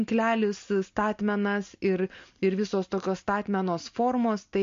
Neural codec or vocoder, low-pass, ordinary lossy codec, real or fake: none; 7.2 kHz; MP3, 48 kbps; real